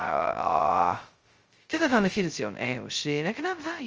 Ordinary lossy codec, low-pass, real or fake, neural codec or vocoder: Opus, 24 kbps; 7.2 kHz; fake; codec, 16 kHz, 0.2 kbps, FocalCodec